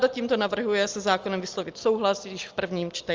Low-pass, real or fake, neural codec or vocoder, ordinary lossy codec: 7.2 kHz; real; none; Opus, 32 kbps